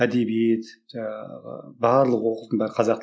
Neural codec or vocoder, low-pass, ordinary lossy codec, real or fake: none; none; none; real